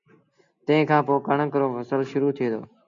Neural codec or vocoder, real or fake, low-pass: none; real; 7.2 kHz